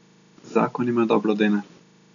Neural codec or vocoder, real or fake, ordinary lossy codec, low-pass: none; real; none; 7.2 kHz